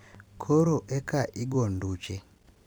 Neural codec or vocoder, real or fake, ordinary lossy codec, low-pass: vocoder, 44.1 kHz, 128 mel bands every 256 samples, BigVGAN v2; fake; none; none